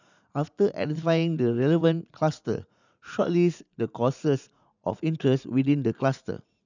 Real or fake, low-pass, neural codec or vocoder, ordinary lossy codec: fake; 7.2 kHz; vocoder, 44.1 kHz, 80 mel bands, Vocos; none